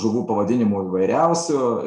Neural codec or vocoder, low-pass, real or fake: none; 10.8 kHz; real